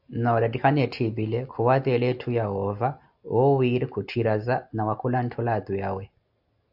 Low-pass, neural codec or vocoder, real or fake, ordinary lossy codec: 5.4 kHz; none; real; AAC, 48 kbps